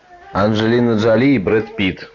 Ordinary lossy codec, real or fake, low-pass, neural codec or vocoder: Opus, 64 kbps; real; 7.2 kHz; none